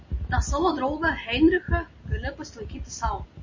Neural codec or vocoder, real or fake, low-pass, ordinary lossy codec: none; real; 7.2 kHz; MP3, 32 kbps